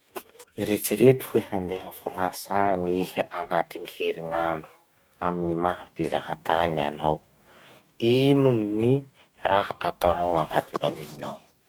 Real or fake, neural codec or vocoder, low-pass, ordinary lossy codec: fake; codec, 44.1 kHz, 2.6 kbps, DAC; none; none